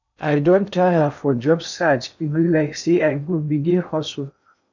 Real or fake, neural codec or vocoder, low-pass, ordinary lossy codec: fake; codec, 16 kHz in and 24 kHz out, 0.6 kbps, FocalCodec, streaming, 2048 codes; 7.2 kHz; none